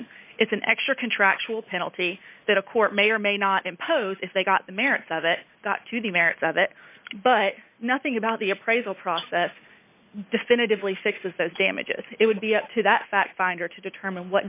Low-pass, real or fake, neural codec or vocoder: 3.6 kHz; real; none